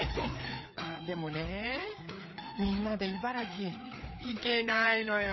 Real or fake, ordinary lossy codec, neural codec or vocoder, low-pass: fake; MP3, 24 kbps; codec, 16 kHz, 4 kbps, FreqCodec, larger model; 7.2 kHz